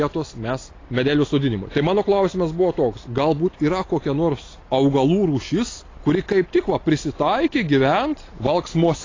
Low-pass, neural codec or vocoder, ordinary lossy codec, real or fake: 7.2 kHz; none; AAC, 32 kbps; real